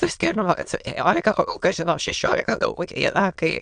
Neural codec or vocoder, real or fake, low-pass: autoencoder, 22.05 kHz, a latent of 192 numbers a frame, VITS, trained on many speakers; fake; 9.9 kHz